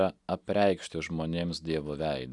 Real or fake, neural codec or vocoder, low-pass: real; none; 10.8 kHz